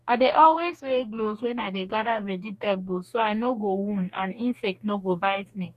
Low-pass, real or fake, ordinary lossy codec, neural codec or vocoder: 14.4 kHz; fake; none; codec, 44.1 kHz, 2.6 kbps, DAC